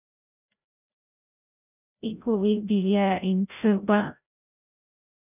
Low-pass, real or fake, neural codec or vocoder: 3.6 kHz; fake; codec, 16 kHz, 0.5 kbps, FreqCodec, larger model